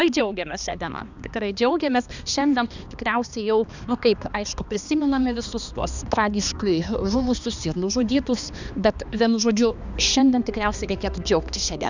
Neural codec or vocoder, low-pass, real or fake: codec, 16 kHz, 2 kbps, X-Codec, HuBERT features, trained on balanced general audio; 7.2 kHz; fake